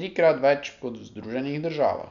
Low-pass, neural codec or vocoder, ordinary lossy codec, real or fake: 7.2 kHz; none; none; real